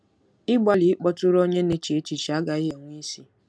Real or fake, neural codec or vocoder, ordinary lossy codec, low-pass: real; none; none; none